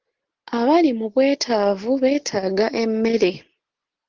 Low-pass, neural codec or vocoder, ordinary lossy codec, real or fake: 7.2 kHz; none; Opus, 16 kbps; real